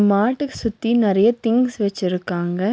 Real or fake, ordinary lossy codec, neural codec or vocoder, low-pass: real; none; none; none